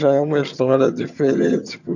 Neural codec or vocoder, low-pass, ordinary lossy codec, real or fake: vocoder, 22.05 kHz, 80 mel bands, HiFi-GAN; 7.2 kHz; none; fake